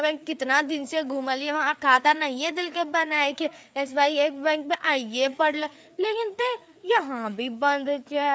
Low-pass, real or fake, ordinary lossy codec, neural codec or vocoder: none; fake; none; codec, 16 kHz, 4 kbps, FreqCodec, larger model